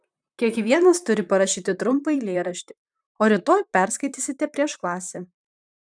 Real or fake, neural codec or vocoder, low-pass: fake; vocoder, 44.1 kHz, 128 mel bands, Pupu-Vocoder; 9.9 kHz